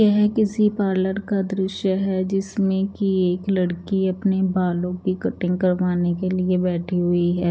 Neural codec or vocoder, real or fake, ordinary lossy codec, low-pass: none; real; none; none